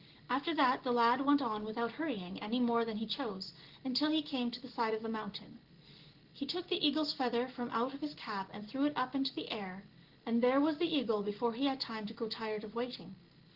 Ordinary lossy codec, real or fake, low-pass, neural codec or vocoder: Opus, 16 kbps; real; 5.4 kHz; none